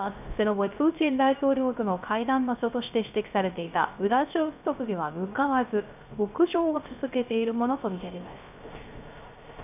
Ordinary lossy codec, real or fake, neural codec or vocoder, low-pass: none; fake; codec, 16 kHz, 0.3 kbps, FocalCodec; 3.6 kHz